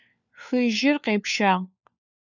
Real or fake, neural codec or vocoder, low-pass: fake; codec, 16 kHz, 4 kbps, FunCodec, trained on LibriTTS, 50 frames a second; 7.2 kHz